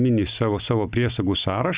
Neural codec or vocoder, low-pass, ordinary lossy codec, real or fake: none; 3.6 kHz; Opus, 64 kbps; real